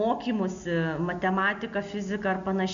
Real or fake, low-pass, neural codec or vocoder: real; 7.2 kHz; none